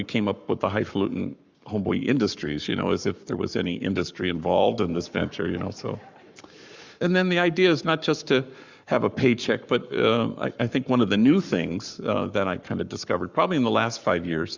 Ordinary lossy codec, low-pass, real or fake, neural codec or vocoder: Opus, 64 kbps; 7.2 kHz; fake; codec, 44.1 kHz, 7.8 kbps, Pupu-Codec